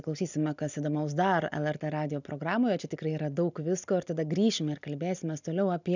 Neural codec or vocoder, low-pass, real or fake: none; 7.2 kHz; real